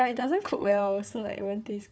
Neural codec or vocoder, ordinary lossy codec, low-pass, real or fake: codec, 16 kHz, 4 kbps, FunCodec, trained on Chinese and English, 50 frames a second; none; none; fake